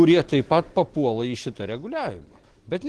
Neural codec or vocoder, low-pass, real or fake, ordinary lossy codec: none; 10.8 kHz; real; Opus, 16 kbps